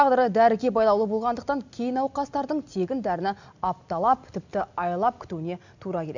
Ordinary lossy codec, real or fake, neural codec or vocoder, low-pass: none; real; none; 7.2 kHz